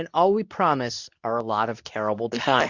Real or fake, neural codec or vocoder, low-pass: fake; codec, 24 kHz, 0.9 kbps, WavTokenizer, medium speech release version 2; 7.2 kHz